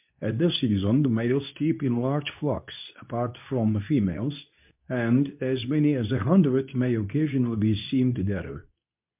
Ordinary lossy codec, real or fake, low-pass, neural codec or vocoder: MP3, 32 kbps; fake; 3.6 kHz; codec, 24 kHz, 0.9 kbps, WavTokenizer, medium speech release version 2